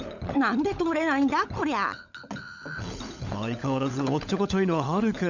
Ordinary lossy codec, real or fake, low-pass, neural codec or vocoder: none; fake; 7.2 kHz; codec, 16 kHz, 16 kbps, FunCodec, trained on LibriTTS, 50 frames a second